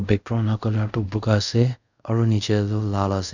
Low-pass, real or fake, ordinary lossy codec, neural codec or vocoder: 7.2 kHz; fake; none; codec, 24 kHz, 0.5 kbps, DualCodec